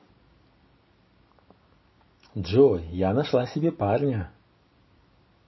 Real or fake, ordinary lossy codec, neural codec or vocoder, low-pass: real; MP3, 24 kbps; none; 7.2 kHz